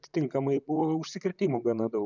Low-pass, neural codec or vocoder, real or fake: 7.2 kHz; vocoder, 44.1 kHz, 128 mel bands, Pupu-Vocoder; fake